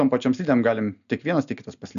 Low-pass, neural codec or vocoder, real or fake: 7.2 kHz; none; real